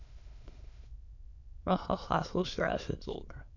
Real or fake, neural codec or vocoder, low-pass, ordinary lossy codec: fake; autoencoder, 22.05 kHz, a latent of 192 numbers a frame, VITS, trained on many speakers; 7.2 kHz; none